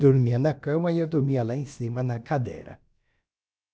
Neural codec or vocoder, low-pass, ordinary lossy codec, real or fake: codec, 16 kHz, about 1 kbps, DyCAST, with the encoder's durations; none; none; fake